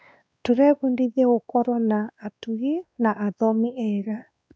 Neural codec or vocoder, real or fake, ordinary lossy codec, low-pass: codec, 16 kHz, 2 kbps, X-Codec, WavLM features, trained on Multilingual LibriSpeech; fake; none; none